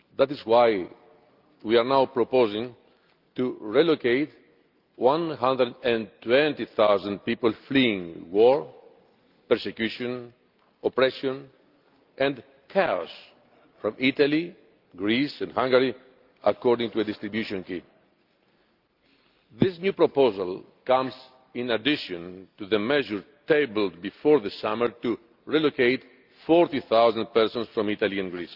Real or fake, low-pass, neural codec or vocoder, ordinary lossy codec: real; 5.4 kHz; none; Opus, 24 kbps